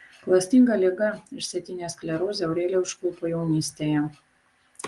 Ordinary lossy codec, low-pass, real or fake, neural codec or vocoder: Opus, 24 kbps; 10.8 kHz; real; none